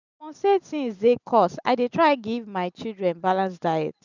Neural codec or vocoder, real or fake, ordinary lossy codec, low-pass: none; real; none; 7.2 kHz